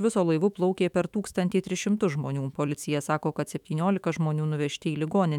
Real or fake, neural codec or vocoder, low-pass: fake; autoencoder, 48 kHz, 128 numbers a frame, DAC-VAE, trained on Japanese speech; 19.8 kHz